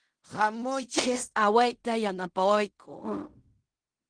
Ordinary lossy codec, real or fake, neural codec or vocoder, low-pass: Opus, 24 kbps; fake; codec, 16 kHz in and 24 kHz out, 0.4 kbps, LongCat-Audio-Codec, fine tuned four codebook decoder; 9.9 kHz